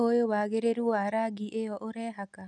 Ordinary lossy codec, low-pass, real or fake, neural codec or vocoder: none; 10.8 kHz; real; none